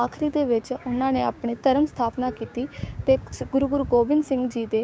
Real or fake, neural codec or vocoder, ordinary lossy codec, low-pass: fake; codec, 16 kHz, 6 kbps, DAC; none; none